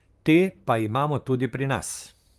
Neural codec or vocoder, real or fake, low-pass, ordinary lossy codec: vocoder, 44.1 kHz, 128 mel bands, Pupu-Vocoder; fake; 14.4 kHz; Opus, 32 kbps